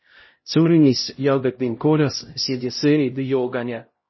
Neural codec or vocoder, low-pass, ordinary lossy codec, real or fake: codec, 16 kHz, 0.5 kbps, X-Codec, HuBERT features, trained on LibriSpeech; 7.2 kHz; MP3, 24 kbps; fake